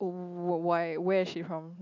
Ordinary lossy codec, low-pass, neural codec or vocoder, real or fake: AAC, 48 kbps; 7.2 kHz; none; real